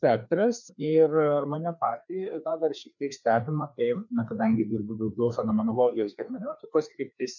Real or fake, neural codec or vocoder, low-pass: fake; codec, 16 kHz, 2 kbps, FreqCodec, larger model; 7.2 kHz